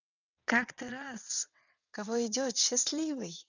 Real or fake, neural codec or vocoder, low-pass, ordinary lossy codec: fake; vocoder, 22.05 kHz, 80 mel bands, WaveNeXt; 7.2 kHz; none